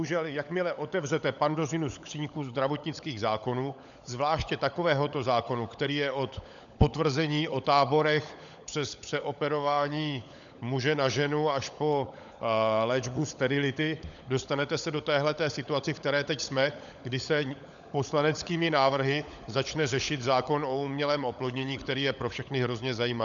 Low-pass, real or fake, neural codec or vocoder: 7.2 kHz; fake; codec, 16 kHz, 16 kbps, FunCodec, trained on LibriTTS, 50 frames a second